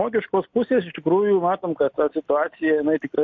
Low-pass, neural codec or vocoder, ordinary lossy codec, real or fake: 7.2 kHz; none; MP3, 48 kbps; real